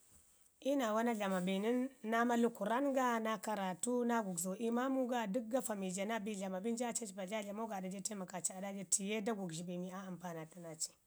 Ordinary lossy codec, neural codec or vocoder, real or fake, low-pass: none; none; real; none